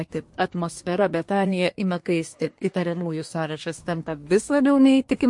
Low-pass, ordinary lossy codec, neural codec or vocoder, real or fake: 10.8 kHz; MP3, 48 kbps; codec, 24 kHz, 1 kbps, SNAC; fake